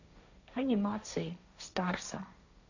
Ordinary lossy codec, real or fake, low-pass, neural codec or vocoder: none; fake; 7.2 kHz; codec, 16 kHz, 1.1 kbps, Voila-Tokenizer